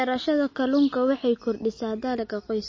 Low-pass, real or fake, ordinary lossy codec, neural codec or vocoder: 7.2 kHz; real; MP3, 32 kbps; none